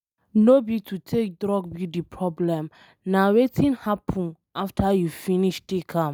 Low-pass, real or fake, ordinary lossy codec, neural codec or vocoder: none; real; none; none